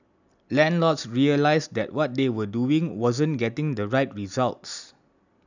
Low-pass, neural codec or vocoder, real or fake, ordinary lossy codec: 7.2 kHz; none; real; none